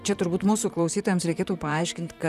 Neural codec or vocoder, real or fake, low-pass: vocoder, 44.1 kHz, 128 mel bands, Pupu-Vocoder; fake; 14.4 kHz